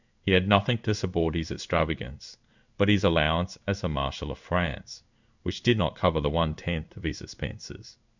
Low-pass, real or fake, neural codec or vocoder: 7.2 kHz; fake; codec, 16 kHz in and 24 kHz out, 1 kbps, XY-Tokenizer